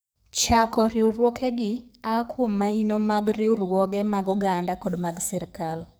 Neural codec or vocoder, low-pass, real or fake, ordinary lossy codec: codec, 44.1 kHz, 2.6 kbps, SNAC; none; fake; none